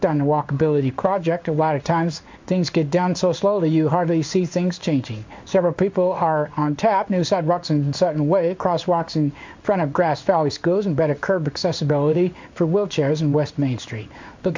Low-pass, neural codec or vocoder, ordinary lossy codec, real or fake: 7.2 kHz; codec, 16 kHz in and 24 kHz out, 1 kbps, XY-Tokenizer; MP3, 64 kbps; fake